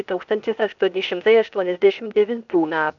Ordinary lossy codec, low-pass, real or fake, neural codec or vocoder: MP3, 64 kbps; 7.2 kHz; fake; codec, 16 kHz, 0.8 kbps, ZipCodec